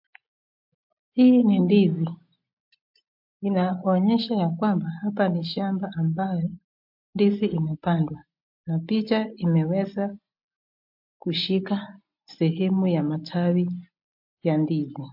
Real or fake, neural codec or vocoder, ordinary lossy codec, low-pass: real; none; MP3, 48 kbps; 5.4 kHz